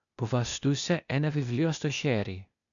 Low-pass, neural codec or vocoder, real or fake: 7.2 kHz; codec, 16 kHz, 0.8 kbps, ZipCodec; fake